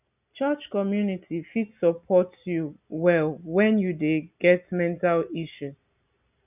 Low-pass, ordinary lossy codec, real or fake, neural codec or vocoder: 3.6 kHz; none; real; none